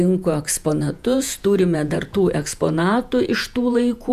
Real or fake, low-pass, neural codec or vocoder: fake; 14.4 kHz; vocoder, 48 kHz, 128 mel bands, Vocos